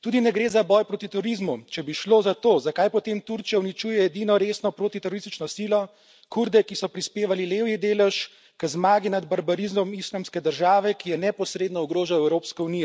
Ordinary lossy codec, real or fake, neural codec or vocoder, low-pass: none; real; none; none